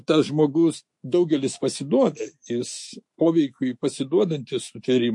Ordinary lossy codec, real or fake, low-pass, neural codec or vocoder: MP3, 48 kbps; fake; 10.8 kHz; autoencoder, 48 kHz, 128 numbers a frame, DAC-VAE, trained on Japanese speech